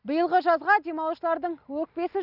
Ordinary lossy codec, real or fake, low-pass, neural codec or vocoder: none; real; 5.4 kHz; none